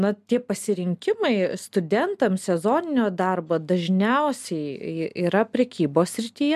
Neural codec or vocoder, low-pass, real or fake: none; 14.4 kHz; real